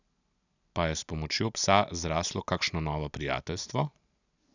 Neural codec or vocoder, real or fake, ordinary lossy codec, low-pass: none; real; none; 7.2 kHz